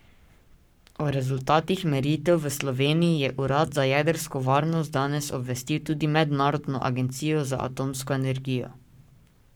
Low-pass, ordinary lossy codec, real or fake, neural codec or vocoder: none; none; fake; codec, 44.1 kHz, 7.8 kbps, Pupu-Codec